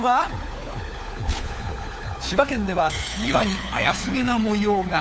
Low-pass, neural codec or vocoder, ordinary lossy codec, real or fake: none; codec, 16 kHz, 4 kbps, FunCodec, trained on LibriTTS, 50 frames a second; none; fake